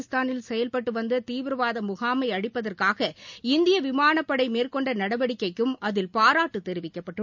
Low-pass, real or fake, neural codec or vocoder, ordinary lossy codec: 7.2 kHz; real; none; none